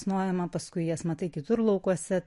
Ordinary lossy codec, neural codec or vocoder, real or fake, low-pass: MP3, 48 kbps; none; real; 14.4 kHz